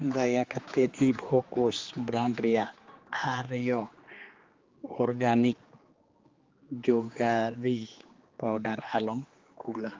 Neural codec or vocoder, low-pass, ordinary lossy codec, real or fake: codec, 16 kHz, 2 kbps, X-Codec, HuBERT features, trained on general audio; 7.2 kHz; Opus, 32 kbps; fake